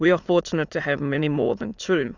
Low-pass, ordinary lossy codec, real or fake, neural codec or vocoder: 7.2 kHz; Opus, 64 kbps; fake; autoencoder, 22.05 kHz, a latent of 192 numbers a frame, VITS, trained on many speakers